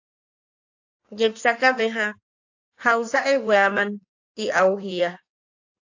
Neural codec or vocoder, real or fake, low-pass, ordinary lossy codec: codec, 16 kHz in and 24 kHz out, 1.1 kbps, FireRedTTS-2 codec; fake; 7.2 kHz; AAC, 48 kbps